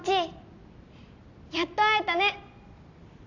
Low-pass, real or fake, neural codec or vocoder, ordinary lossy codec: 7.2 kHz; real; none; AAC, 48 kbps